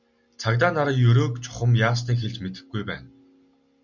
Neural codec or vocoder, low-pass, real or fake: none; 7.2 kHz; real